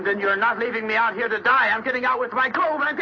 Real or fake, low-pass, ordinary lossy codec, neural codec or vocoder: real; 7.2 kHz; MP3, 32 kbps; none